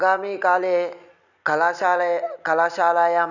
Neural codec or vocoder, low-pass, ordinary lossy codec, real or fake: none; 7.2 kHz; none; real